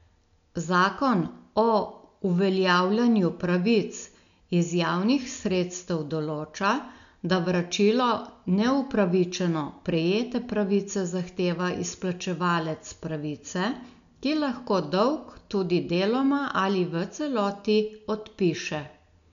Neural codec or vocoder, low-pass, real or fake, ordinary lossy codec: none; 7.2 kHz; real; none